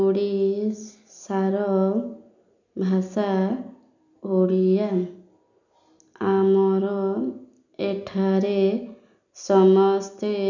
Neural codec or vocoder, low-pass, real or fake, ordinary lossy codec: none; 7.2 kHz; real; none